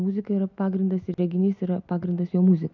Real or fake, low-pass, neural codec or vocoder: real; 7.2 kHz; none